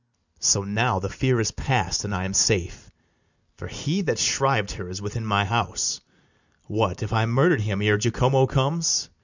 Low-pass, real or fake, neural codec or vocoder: 7.2 kHz; real; none